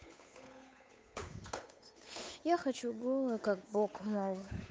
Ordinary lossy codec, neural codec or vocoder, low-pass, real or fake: none; codec, 16 kHz, 8 kbps, FunCodec, trained on Chinese and English, 25 frames a second; none; fake